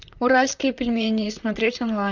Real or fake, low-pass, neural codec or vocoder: fake; 7.2 kHz; vocoder, 44.1 kHz, 128 mel bands, Pupu-Vocoder